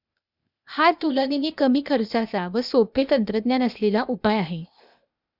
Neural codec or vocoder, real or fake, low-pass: codec, 16 kHz, 0.8 kbps, ZipCodec; fake; 5.4 kHz